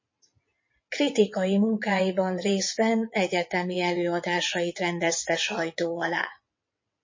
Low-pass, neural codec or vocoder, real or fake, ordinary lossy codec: 7.2 kHz; vocoder, 22.05 kHz, 80 mel bands, WaveNeXt; fake; MP3, 32 kbps